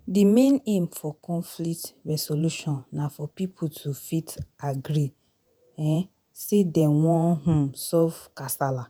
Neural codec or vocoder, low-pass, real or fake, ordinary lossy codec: vocoder, 48 kHz, 128 mel bands, Vocos; none; fake; none